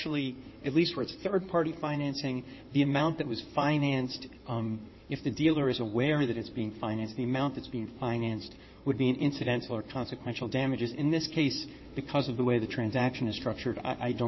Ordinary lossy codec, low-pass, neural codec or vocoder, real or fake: MP3, 24 kbps; 7.2 kHz; codec, 16 kHz in and 24 kHz out, 2.2 kbps, FireRedTTS-2 codec; fake